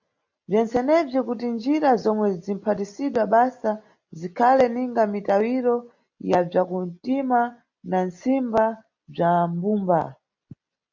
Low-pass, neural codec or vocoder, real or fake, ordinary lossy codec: 7.2 kHz; none; real; MP3, 48 kbps